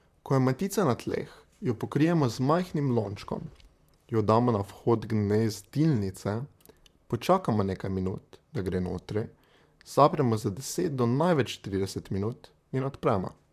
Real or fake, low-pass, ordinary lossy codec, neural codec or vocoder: fake; 14.4 kHz; MP3, 96 kbps; vocoder, 44.1 kHz, 128 mel bands, Pupu-Vocoder